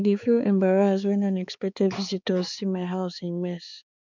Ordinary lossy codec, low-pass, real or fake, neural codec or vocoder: none; 7.2 kHz; fake; codec, 16 kHz, 4 kbps, X-Codec, HuBERT features, trained on balanced general audio